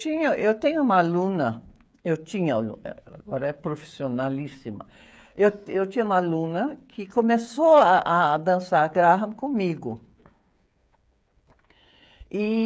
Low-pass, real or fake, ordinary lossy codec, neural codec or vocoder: none; fake; none; codec, 16 kHz, 8 kbps, FreqCodec, smaller model